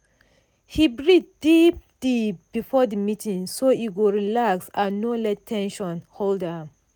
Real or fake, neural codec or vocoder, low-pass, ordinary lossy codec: real; none; none; none